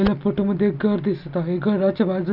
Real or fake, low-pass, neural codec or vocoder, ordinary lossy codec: real; 5.4 kHz; none; none